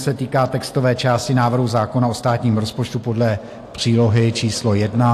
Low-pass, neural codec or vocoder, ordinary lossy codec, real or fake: 14.4 kHz; none; AAC, 64 kbps; real